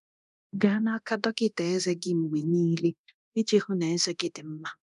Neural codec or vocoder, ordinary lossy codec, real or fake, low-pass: codec, 24 kHz, 0.9 kbps, DualCodec; none; fake; 10.8 kHz